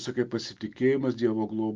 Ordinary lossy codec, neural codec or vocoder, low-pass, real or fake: Opus, 32 kbps; none; 7.2 kHz; real